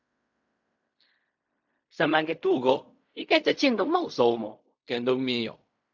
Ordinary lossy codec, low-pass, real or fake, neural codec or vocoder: MP3, 64 kbps; 7.2 kHz; fake; codec, 16 kHz in and 24 kHz out, 0.4 kbps, LongCat-Audio-Codec, fine tuned four codebook decoder